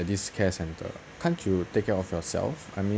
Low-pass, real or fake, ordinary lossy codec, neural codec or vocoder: none; real; none; none